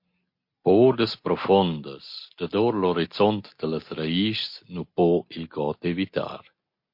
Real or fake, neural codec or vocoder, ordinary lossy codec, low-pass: real; none; MP3, 32 kbps; 5.4 kHz